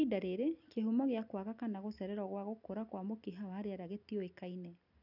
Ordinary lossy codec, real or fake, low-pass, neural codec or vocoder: none; real; 5.4 kHz; none